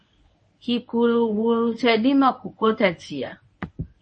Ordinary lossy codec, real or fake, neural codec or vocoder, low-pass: MP3, 32 kbps; fake; codec, 24 kHz, 0.9 kbps, WavTokenizer, medium speech release version 1; 10.8 kHz